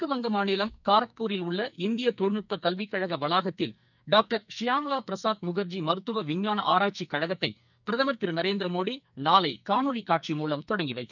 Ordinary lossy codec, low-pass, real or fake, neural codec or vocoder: none; 7.2 kHz; fake; codec, 44.1 kHz, 2.6 kbps, SNAC